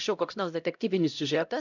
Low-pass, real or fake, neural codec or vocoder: 7.2 kHz; fake; codec, 16 kHz, 0.5 kbps, X-Codec, HuBERT features, trained on LibriSpeech